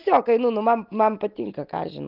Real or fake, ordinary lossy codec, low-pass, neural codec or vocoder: real; Opus, 32 kbps; 5.4 kHz; none